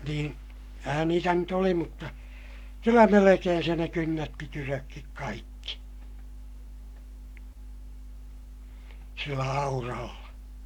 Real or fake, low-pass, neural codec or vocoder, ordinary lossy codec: fake; 19.8 kHz; codec, 44.1 kHz, 7.8 kbps, Pupu-Codec; none